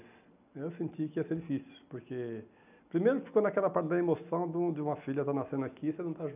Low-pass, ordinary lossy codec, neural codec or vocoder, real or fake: 3.6 kHz; none; none; real